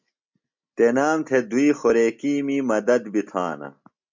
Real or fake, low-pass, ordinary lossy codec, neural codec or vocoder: real; 7.2 kHz; MP3, 64 kbps; none